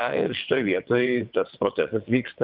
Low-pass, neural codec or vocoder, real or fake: 5.4 kHz; vocoder, 22.05 kHz, 80 mel bands, WaveNeXt; fake